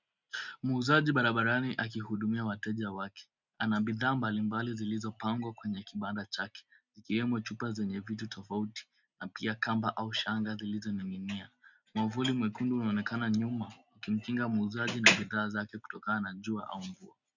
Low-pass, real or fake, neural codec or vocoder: 7.2 kHz; real; none